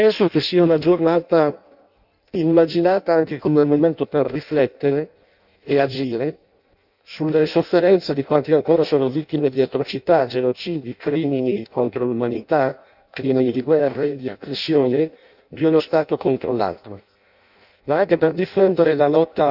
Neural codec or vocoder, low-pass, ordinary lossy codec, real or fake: codec, 16 kHz in and 24 kHz out, 0.6 kbps, FireRedTTS-2 codec; 5.4 kHz; none; fake